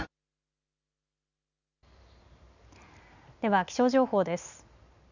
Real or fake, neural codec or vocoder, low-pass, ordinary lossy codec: real; none; 7.2 kHz; none